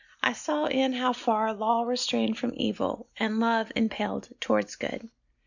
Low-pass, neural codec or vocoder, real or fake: 7.2 kHz; none; real